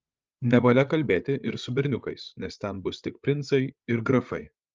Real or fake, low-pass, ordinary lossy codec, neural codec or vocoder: fake; 7.2 kHz; Opus, 24 kbps; codec, 16 kHz, 8 kbps, FreqCodec, larger model